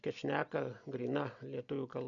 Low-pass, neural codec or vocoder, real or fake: 7.2 kHz; none; real